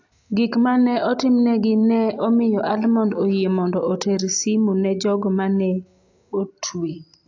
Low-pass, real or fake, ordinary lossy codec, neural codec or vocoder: 7.2 kHz; real; AAC, 48 kbps; none